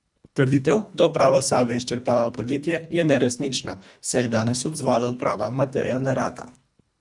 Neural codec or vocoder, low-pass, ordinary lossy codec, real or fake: codec, 24 kHz, 1.5 kbps, HILCodec; 10.8 kHz; none; fake